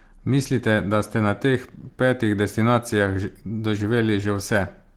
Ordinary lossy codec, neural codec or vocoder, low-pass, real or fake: Opus, 16 kbps; none; 19.8 kHz; real